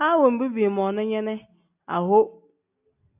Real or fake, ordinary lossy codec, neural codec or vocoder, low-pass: real; AAC, 32 kbps; none; 3.6 kHz